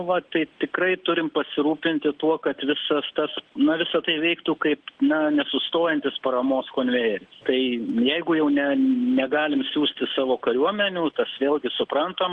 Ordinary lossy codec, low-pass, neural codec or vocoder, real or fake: Opus, 16 kbps; 9.9 kHz; none; real